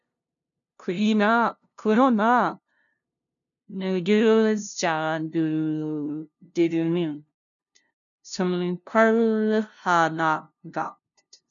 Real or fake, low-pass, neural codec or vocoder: fake; 7.2 kHz; codec, 16 kHz, 0.5 kbps, FunCodec, trained on LibriTTS, 25 frames a second